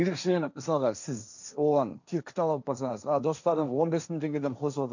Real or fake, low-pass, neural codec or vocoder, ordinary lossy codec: fake; 7.2 kHz; codec, 16 kHz, 1.1 kbps, Voila-Tokenizer; none